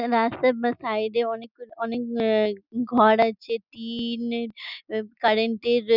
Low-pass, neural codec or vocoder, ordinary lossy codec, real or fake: 5.4 kHz; none; none; real